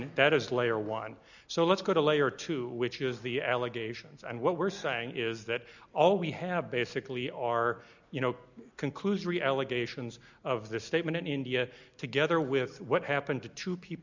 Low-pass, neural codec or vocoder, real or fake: 7.2 kHz; none; real